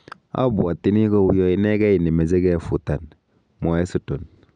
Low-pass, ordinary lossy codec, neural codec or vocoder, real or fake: 9.9 kHz; none; none; real